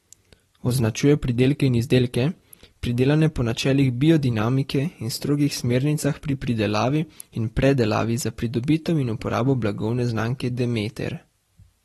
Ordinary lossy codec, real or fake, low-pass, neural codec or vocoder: AAC, 32 kbps; fake; 19.8 kHz; vocoder, 44.1 kHz, 128 mel bands every 256 samples, BigVGAN v2